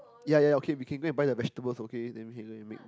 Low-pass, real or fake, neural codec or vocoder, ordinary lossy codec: none; real; none; none